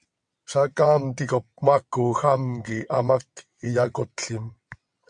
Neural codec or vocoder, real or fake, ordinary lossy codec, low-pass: vocoder, 22.05 kHz, 80 mel bands, WaveNeXt; fake; MP3, 64 kbps; 9.9 kHz